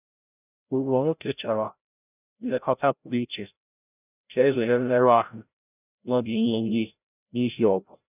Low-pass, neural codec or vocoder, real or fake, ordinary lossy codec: 3.6 kHz; codec, 16 kHz, 0.5 kbps, FreqCodec, larger model; fake; none